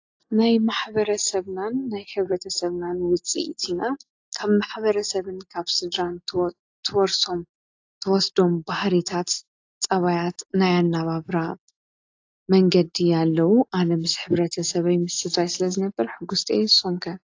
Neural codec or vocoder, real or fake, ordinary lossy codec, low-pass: none; real; AAC, 48 kbps; 7.2 kHz